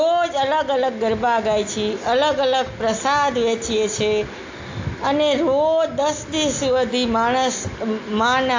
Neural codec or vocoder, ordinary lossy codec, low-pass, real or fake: none; AAC, 48 kbps; 7.2 kHz; real